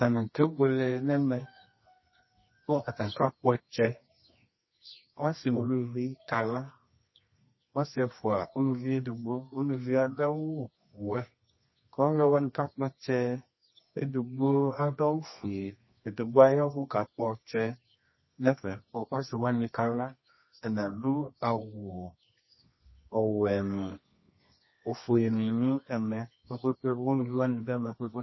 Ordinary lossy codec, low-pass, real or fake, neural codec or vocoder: MP3, 24 kbps; 7.2 kHz; fake; codec, 24 kHz, 0.9 kbps, WavTokenizer, medium music audio release